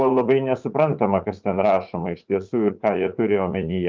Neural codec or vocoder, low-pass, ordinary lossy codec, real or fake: vocoder, 44.1 kHz, 80 mel bands, Vocos; 7.2 kHz; Opus, 24 kbps; fake